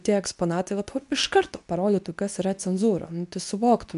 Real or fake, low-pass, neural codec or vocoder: fake; 10.8 kHz; codec, 24 kHz, 0.9 kbps, WavTokenizer, medium speech release version 2